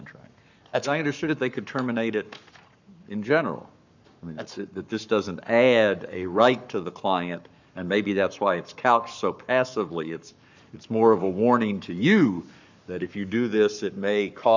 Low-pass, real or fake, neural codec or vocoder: 7.2 kHz; fake; codec, 44.1 kHz, 7.8 kbps, Pupu-Codec